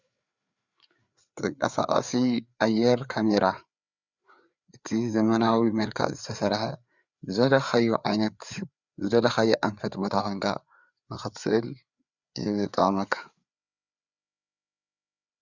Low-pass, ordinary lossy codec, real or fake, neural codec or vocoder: 7.2 kHz; Opus, 64 kbps; fake; codec, 16 kHz, 4 kbps, FreqCodec, larger model